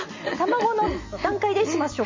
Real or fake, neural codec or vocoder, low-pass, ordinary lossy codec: real; none; 7.2 kHz; MP3, 32 kbps